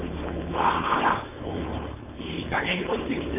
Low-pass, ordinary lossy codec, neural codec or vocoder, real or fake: 3.6 kHz; none; codec, 16 kHz, 4.8 kbps, FACodec; fake